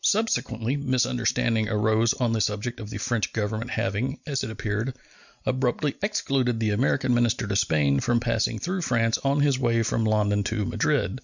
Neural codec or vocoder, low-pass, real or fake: none; 7.2 kHz; real